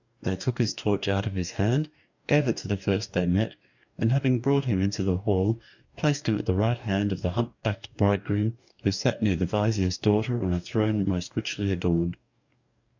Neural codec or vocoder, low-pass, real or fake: codec, 44.1 kHz, 2.6 kbps, DAC; 7.2 kHz; fake